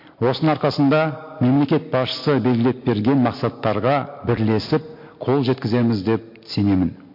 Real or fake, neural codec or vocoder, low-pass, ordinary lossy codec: real; none; 5.4 kHz; MP3, 32 kbps